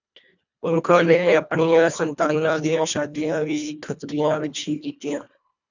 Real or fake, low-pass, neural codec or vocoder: fake; 7.2 kHz; codec, 24 kHz, 1.5 kbps, HILCodec